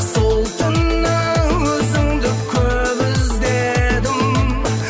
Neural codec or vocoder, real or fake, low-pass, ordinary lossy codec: none; real; none; none